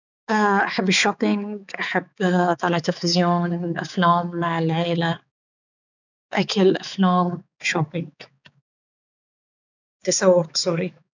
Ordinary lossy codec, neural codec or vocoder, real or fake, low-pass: none; codec, 16 kHz, 4 kbps, X-Codec, HuBERT features, trained on balanced general audio; fake; 7.2 kHz